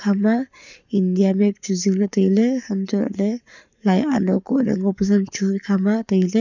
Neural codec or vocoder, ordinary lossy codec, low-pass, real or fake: codec, 44.1 kHz, 7.8 kbps, Pupu-Codec; none; 7.2 kHz; fake